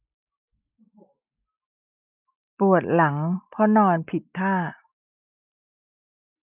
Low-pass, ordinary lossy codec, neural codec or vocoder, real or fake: 3.6 kHz; none; none; real